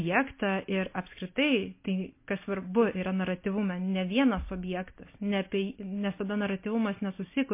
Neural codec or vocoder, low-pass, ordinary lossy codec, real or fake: none; 3.6 kHz; MP3, 24 kbps; real